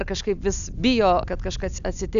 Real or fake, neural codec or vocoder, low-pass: real; none; 7.2 kHz